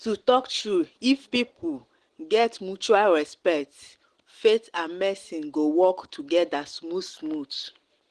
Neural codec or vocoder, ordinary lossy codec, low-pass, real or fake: none; Opus, 16 kbps; 9.9 kHz; real